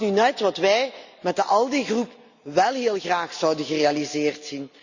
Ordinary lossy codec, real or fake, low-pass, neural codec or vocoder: Opus, 64 kbps; real; 7.2 kHz; none